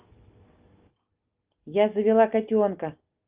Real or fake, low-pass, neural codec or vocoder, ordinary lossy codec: real; 3.6 kHz; none; Opus, 24 kbps